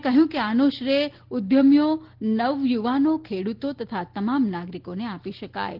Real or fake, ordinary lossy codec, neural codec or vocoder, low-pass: real; Opus, 16 kbps; none; 5.4 kHz